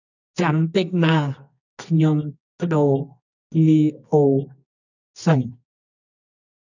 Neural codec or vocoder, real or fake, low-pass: codec, 24 kHz, 0.9 kbps, WavTokenizer, medium music audio release; fake; 7.2 kHz